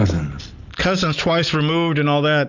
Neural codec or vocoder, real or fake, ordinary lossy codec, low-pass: none; real; Opus, 64 kbps; 7.2 kHz